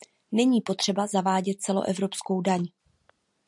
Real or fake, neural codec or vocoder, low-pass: real; none; 10.8 kHz